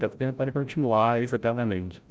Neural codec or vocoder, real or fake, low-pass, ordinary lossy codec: codec, 16 kHz, 0.5 kbps, FreqCodec, larger model; fake; none; none